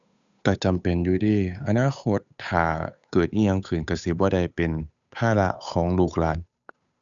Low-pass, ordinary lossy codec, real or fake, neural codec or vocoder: 7.2 kHz; none; fake; codec, 16 kHz, 8 kbps, FunCodec, trained on Chinese and English, 25 frames a second